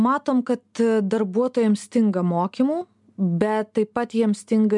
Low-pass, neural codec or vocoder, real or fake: 10.8 kHz; none; real